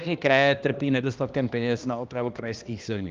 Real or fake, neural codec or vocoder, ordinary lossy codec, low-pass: fake; codec, 16 kHz, 1 kbps, X-Codec, HuBERT features, trained on balanced general audio; Opus, 32 kbps; 7.2 kHz